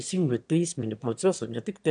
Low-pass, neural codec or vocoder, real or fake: 9.9 kHz; autoencoder, 22.05 kHz, a latent of 192 numbers a frame, VITS, trained on one speaker; fake